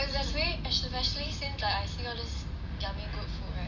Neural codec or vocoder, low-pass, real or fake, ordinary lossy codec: none; 7.2 kHz; real; none